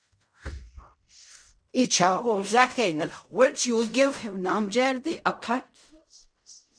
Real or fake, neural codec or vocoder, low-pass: fake; codec, 16 kHz in and 24 kHz out, 0.4 kbps, LongCat-Audio-Codec, fine tuned four codebook decoder; 9.9 kHz